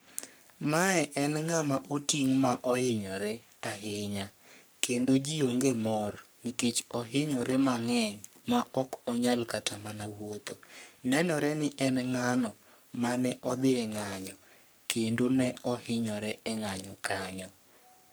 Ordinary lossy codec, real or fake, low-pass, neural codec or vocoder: none; fake; none; codec, 44.1 kHz, 3.4 kbps, Pupu-Codec